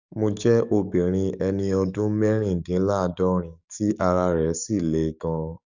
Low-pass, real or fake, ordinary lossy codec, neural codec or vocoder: 7.2 kHz; fake; none; codec, 44.1 kHz, 7.8 kbps, DAC